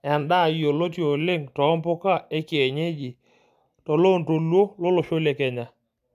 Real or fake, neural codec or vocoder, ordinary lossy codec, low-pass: real; none; none; 14.4 kHz